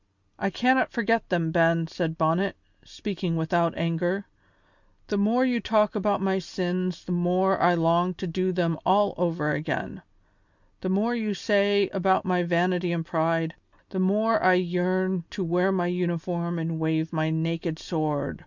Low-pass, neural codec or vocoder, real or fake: 7.2 kHz; none; real